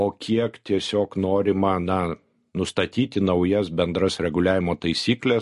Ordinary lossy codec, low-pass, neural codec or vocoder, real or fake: MP3, 48 kbps; 14.4 kHz; none; real